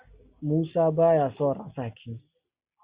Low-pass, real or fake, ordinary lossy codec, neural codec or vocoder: 3.6 kHz; real; Opus, 64 kbps; none